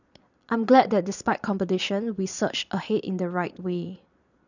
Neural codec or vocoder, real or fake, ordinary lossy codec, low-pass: vocoder, 22.05 kHz, 80 mel bands, Vocos; fake; none; 7.2 kHz